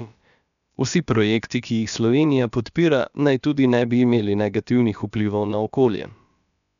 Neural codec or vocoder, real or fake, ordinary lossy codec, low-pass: codec, 16 kHz, about 1 kbps, DyCAST, with the encoder's durations; fake; none; 7.2 kHz